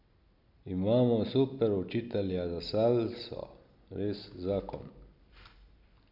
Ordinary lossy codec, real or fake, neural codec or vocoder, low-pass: none; real; none; 5.4 kHz